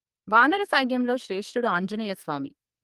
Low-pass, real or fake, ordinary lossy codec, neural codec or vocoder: 14.4 kHz; fake; Opus, 32 kbps; codec, 44.1 kHz, 2.6 kbps, SNAC